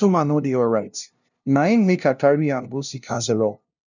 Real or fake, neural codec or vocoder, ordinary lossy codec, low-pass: fake; codec, 16 kHz, 0.5 kbps, FunCodec, trained on LibriTTS, 25 frames a second; none; 7.2 kHz